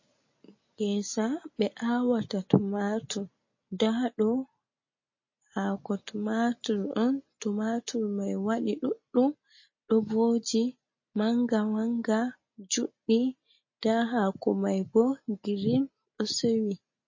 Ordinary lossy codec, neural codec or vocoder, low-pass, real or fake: MP3, 32 kbps; none; 7.2 kHz; real